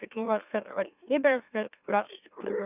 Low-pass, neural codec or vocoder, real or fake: 3.6 kHz; autoencoder, 44.1 kHz, a latent of 192 numbers a frame, MeloTTS; fake